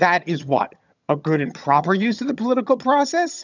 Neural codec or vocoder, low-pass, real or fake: vocoder, 22.05 kHz, 80 mel bands, HiFi-GAN; 7.2 kHz; fake